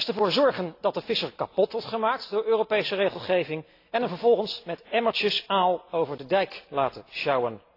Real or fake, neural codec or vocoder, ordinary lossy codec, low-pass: real; none; AAC, 32 kbps; 5.4 kHz